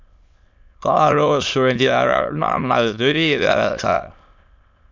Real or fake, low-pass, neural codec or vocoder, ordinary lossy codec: fake; 7.2 kHz; autoencoder, 22.05 kHz, a latent of 192 numbers a frame, VITS, trained on many speakers; MP3, 64 kbps